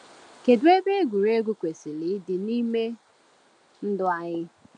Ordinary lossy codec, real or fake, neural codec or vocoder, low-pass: none; real; none; 9.9 kHz